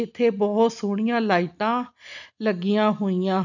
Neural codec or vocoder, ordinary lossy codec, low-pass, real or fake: none; none; 7.2 kHz; real